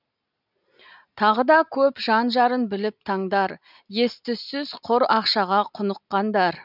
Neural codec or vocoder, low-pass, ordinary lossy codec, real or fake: none; 5.4 kHz; none; real